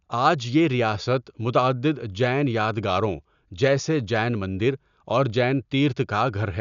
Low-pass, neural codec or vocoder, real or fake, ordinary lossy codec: 7.2 kHz; none; real; none